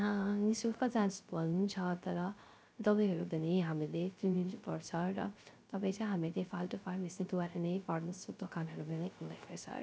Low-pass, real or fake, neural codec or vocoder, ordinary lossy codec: none; fake; codec, 16 kHz, 0.3 kbps, FocalCodec; none